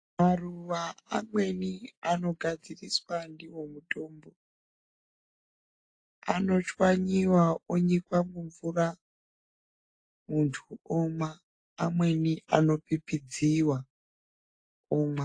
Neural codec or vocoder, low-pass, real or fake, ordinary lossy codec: none; 9.9 kHz; real; AAC, 48 kbps